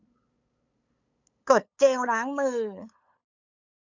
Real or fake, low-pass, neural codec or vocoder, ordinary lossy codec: fake; 7.2 kHz; codec, 16 kHz, 8 kbps, FunCodec, trained on LibriTTS, 25 frames a second; none